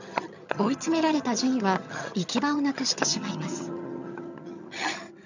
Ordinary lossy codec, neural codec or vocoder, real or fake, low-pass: none; vocoder, 22.05 kHz, 80 mel bands, HiFi-GAN; fake; 7.2 kHz